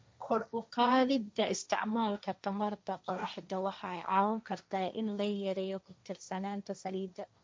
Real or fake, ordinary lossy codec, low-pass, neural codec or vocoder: fake; none; none; codec, 16 kHz, 1.1 kbps, Voila-Tokenizer